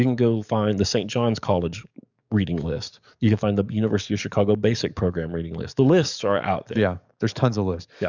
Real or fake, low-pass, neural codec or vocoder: fake; 7.2 kHz; codec, 44.1 kHz, 7.8 kbps, DAC